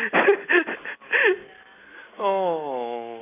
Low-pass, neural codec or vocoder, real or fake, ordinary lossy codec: 3.6 kHz; none; real; AAC, 16 kbps